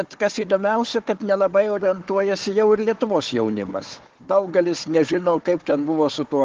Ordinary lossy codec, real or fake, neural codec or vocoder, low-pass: Opus, 16 kbps; fake; codec, 16 kHz, 4 kbps, FunCodec, trained on Chinese and English, 50 frames a second; 7.2 kHz